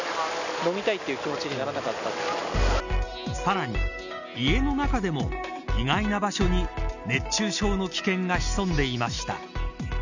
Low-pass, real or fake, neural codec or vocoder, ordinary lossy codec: 7.2 kHz; real; none; none